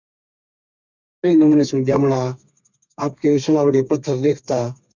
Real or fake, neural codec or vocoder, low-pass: fake; codec, 32 kHz, 1.9 kbps, SNAC; 7.2 kHz